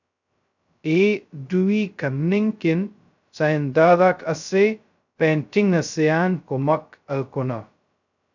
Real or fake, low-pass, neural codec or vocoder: fake; 7.2 kHz; codec, 16 kHz, 0.2 kbps, FocalCodec